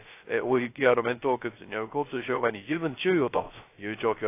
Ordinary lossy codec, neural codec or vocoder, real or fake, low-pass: AAC, 24 kbps; codec, 16 kHz, 0.3 kbps, FocalCodec; fake; 3.6 kHz